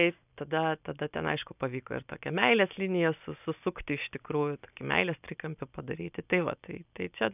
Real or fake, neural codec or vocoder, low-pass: real; none; 3.6 kHz